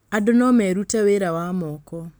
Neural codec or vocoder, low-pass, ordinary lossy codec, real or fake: none; none; none; real